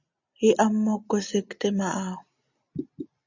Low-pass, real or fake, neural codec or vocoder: 7.2 kHz; real; none